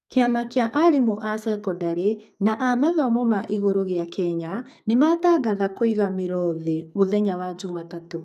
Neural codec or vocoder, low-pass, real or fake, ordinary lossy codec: codec, 44.1 kHz, 2.6 kbps, SNAC; 14.4 kHz; fake; none